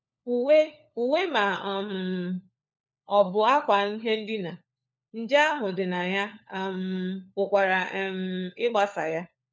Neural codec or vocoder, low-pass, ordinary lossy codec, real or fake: codec, 16 kHz, 4 kbps, FunCodec, trained on LibriTTS, 50 frames a second; none; none; fake